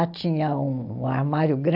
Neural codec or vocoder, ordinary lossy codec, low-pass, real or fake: none; AAC, 48 kbps; 5.4 kHz; real